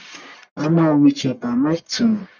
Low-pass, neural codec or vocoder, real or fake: 7.2 kHz; codec, 44.1 kHz, 1.7 kbps, Pupu-Codec; fake